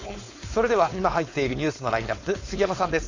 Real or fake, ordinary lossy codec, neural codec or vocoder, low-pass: fake; AAC, 48 kbps; codec, 16 kHz, 4.8 kbps, FACodec; 7.2 kHz